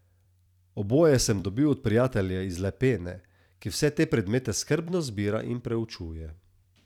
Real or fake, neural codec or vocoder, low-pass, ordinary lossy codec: fake; vocoder, 44.1 kHz, 128 mel bands every 256 samples, BigVGAN v2; 19.8 kHz; none